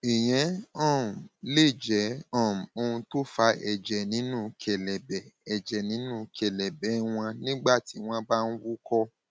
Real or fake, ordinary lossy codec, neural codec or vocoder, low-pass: real; none; none; none